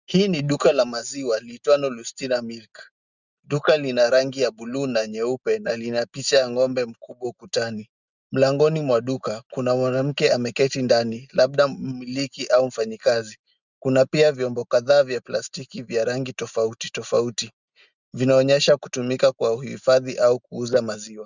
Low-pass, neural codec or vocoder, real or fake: 7.2 kHz; none; real